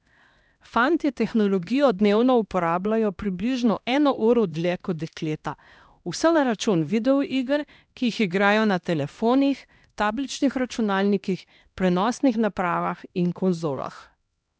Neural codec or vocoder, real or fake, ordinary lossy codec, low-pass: codec, 16 kHz, 1 kbps, X-Codec, HuBERT features, trained on LibriSpeech; fake; none; none